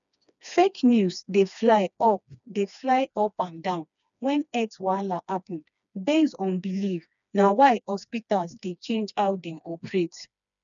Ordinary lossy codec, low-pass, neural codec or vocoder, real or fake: none; 7.2 kHz; codec, 16 kHz, 2 kbps, FreqCodec, smaller model; fake